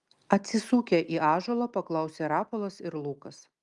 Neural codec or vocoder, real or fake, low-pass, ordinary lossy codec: none; real; 10.8 kHz; Opus, 32 kbps